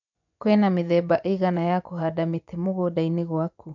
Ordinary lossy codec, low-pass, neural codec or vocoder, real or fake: none; 7.2 kHz; vocoder, 24 kHz, 100 mel bands, Vocos; fake